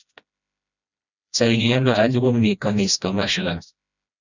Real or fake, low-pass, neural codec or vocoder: fake; 7.2 kHz; codec, 16 kHz, 1 kbps, FreqCodec, smaller model